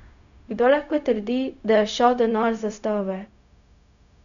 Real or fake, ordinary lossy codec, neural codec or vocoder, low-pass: fake; none; codec, 16 kHz, 0.4 kbps, LongCat-Audio-Codec; 7.2 kHz